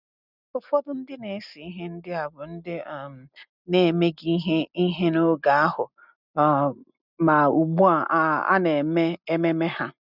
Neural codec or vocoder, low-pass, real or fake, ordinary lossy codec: none; 5.4 kHz; real; none